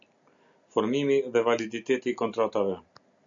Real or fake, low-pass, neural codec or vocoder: real; 7.2 kHz; none